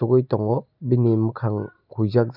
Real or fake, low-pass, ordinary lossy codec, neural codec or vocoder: real; 5.4 kHz; none; none